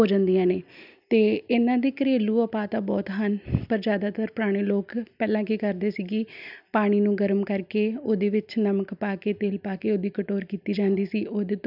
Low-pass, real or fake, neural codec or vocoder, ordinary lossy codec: 5.4 kHz; real; none; none